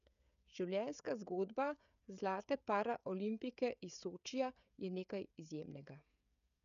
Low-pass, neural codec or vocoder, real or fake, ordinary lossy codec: 7.2 kHz; codec, 16 kHz, 16 kbps, FreqCodec, smaller model; fake; none